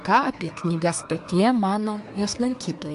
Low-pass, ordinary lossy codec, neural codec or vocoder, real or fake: 10.8 kHz; AAC, 64 kbps; codec, 24 kHz, 1 kbps, SNAC; fake